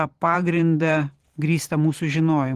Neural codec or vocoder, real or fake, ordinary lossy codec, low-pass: vocoder, 44.1 kHz, 128 mel bands every 512 samples, BigVGAN v2; fake; Opus, 16 kbps; 14.4 kHz